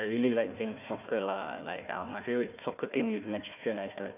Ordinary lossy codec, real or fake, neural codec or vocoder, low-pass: none; fake; codec, 16 kHz, 1 kbps, FunCodec, trained on Chinese and English, 50 frames a second; 3.6 kHz